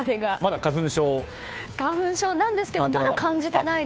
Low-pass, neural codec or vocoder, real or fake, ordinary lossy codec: none; codec, 16 kHz, 2 kbps, FunCodec, trained on Chinese and English, 25 frames a second; fake; none